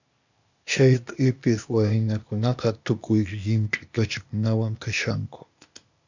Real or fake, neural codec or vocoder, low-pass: fake; codec, 16 kHz, 0.8 kbps, ZipCodec; 7.2 kHz